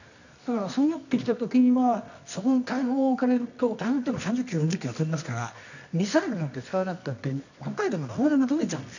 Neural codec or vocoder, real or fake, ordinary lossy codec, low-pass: codec, 24 kHz, 0.9 kbps, WavTokenizer, medium music audio release; fake; none; 7.2 kHz